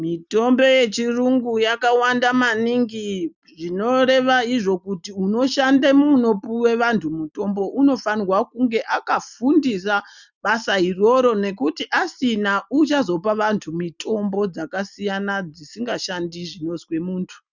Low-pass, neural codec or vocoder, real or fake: 7.2 kHz; none; real